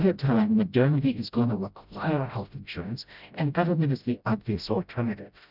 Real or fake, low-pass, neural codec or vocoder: fake; 5.4 kHz; codec, 16 kHz, 0.5 kbps, FreqCodec, smaller model